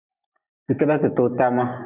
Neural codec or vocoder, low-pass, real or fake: none; 3.6 kHz; real